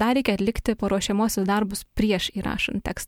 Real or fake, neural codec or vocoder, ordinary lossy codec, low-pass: real; none; MP3, 96 kbps; 19.8 kHz